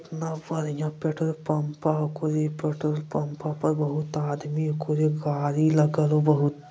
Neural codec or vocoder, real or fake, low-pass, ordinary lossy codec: none; real; none; none